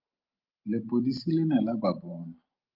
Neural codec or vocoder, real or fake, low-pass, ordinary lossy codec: none; real; 5.4 kHz; Opus, 32 kbps